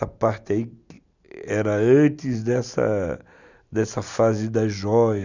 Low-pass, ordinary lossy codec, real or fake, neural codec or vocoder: 7.2 kHz; none; real; none